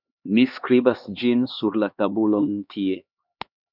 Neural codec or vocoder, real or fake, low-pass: codec, 16 kHz, 2 kbps, X-Codec, HuBERT features, trained on LibriSpeech; fake; 5.4 kHz